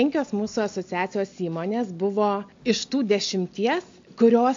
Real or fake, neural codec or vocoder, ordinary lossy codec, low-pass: real; none; MP3, 48 kbps; 7.2 kHz